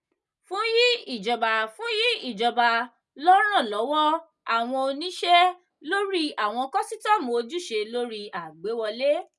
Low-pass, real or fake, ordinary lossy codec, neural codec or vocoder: none; real; none; none